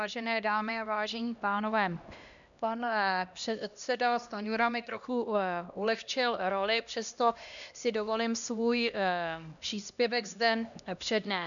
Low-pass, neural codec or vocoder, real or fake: 7.2 kHz; codec, 16 kHz, 1 kbps, X-Codec, HuBERT features, trained on LibriSpeech; fake